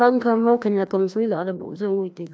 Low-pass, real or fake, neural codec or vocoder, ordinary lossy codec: none; fake; codec, 16 kHz, 1 kbps, FreqCodec, larger model; none